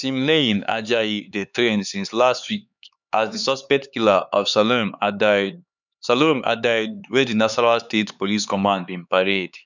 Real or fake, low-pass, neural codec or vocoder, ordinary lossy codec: fake; 7.2 kHz; codec, 16 kHz, 4 kbps, X-Codec, HuBERT features, trained on LibriSpeech; none